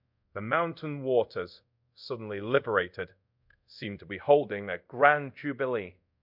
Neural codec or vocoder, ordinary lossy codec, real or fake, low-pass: codec, 24 kHz, 0.5 kbps, DualCodec; MP3, 48 kbps; fake; 5.4 kHz